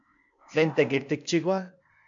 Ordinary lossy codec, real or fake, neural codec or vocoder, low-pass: MP3, 64 kbps; fake; codec, 16 kHz, 0.8 kbps, ZipCodec; 7.2 kHz